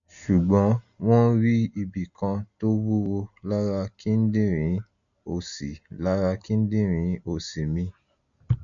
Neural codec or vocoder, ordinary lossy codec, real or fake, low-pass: none; none; real; 7.2 kHz